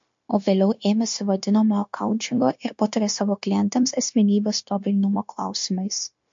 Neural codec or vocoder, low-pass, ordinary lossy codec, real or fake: codec, 16 kHz, 0.9 kbps, LongCat-Audio-Codec; 7.2 kHz; MP3, 48 kbps; fake